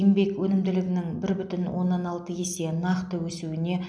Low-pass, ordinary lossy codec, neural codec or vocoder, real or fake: none; none; none; real